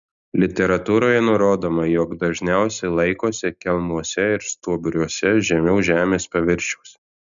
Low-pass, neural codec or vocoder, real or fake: 7.2 kHz; none; real